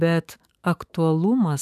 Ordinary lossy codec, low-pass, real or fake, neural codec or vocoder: AAC, 96 kbps; 14.4 kHz; fake; autoencoder, 48 kHz, 128 numbers a frame, DAC-VAE, trained on Japanese speech